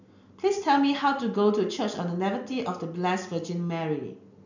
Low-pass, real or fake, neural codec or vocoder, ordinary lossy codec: 7.2 kHz; real; none; none